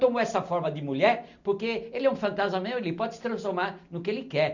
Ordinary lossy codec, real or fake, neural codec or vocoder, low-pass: none; real; none; 7.2 kHz